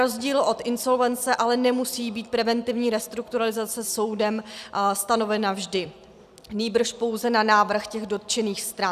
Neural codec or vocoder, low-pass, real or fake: none; 14.4 kHz; real